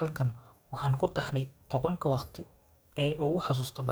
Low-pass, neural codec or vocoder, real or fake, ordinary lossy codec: none; codec, 44.1 kHz, 2.6 kbps, DAC; fake; none